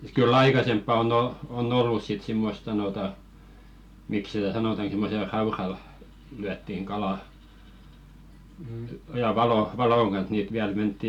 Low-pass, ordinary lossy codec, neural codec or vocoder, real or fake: 19.8 kHz; none; none; real